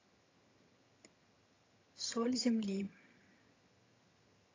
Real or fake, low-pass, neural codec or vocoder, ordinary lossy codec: fake; 7.2 kHz; vocoder, 22.05 kHz, 80 mel bands, HiFi-GAN; AAC, 32 kbps